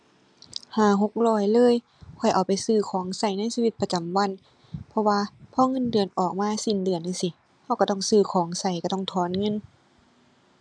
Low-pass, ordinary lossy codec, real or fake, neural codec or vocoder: 9.9 kHz; none; real; none